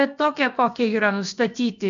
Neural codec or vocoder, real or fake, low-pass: codec, 16 kHz, about 1 kbps, DyCAST, with the encoder's durations; fake; 7.2 kHz